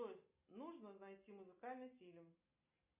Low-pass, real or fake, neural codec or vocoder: 3.6 kHz; real; none